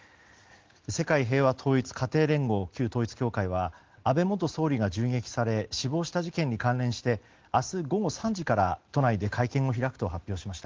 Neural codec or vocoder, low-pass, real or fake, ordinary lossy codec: none; 7.2 kHz; real; Opus, 16 kbps